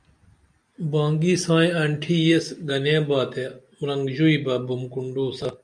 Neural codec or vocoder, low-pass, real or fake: none; 9.9 kHz; real